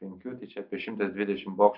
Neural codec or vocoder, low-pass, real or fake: none; 5.4 kHz; real